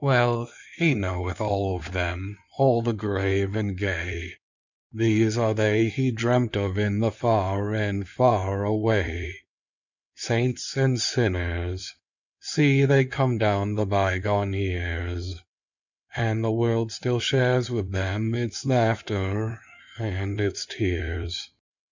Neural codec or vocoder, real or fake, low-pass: codec, 16 kHz in and 24 kHz out, 2.2 kbps, FireRedTTS-2 codec; fake; 7.2 kHz